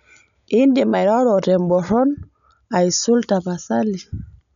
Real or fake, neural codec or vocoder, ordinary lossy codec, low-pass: real; none; none; 7.2 kHz